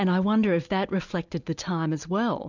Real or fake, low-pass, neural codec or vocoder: real; 7.2 kHz; none